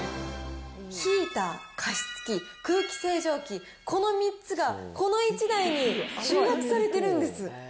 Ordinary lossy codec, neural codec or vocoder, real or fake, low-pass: none; none; real; none